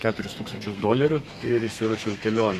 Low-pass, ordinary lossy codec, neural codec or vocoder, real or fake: 14.4 kHz; Opus, 64 kbps; codec, 32 kHz, 1.9 kbps, SNAC; fake